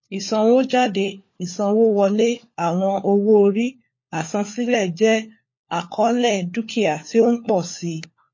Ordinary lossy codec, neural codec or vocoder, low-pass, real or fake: MP3, 32 kbps; codec, 16 kHz, 4 kbps, FunCodec, trained on LibriTTS, 50 frames a second; 7.2 kHz; fake